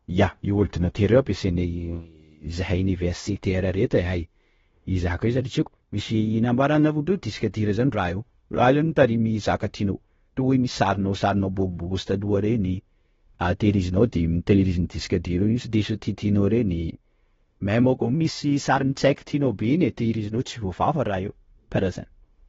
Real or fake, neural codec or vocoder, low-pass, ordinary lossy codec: fake; codec, 16 kHz, 0.9 kbps, LongCat-Audio-Codec; 7.2 kHz; AAC, 24 kbps